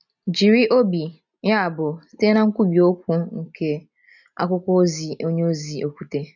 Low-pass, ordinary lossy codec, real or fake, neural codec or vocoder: 7.2 kHz; none; real; none